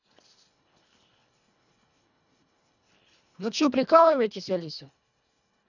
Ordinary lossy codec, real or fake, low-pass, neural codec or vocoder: none; fake; 7.2 kHz; codec, 24 kHz, 1.5 kbps, HILCodec